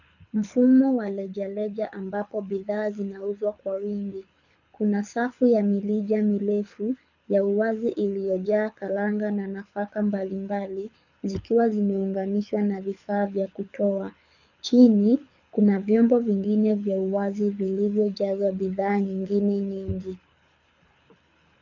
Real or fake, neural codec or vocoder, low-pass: fake; codec, 24 kHz, 6 kbps, HILCodec; 7.2 kHz